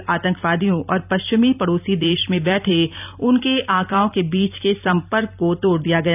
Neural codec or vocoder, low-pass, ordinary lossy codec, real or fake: vocoder, 44.1 kHz, 128 mel bands every 256 samples, BigVGAN v2; 3.6 kHz; none; fake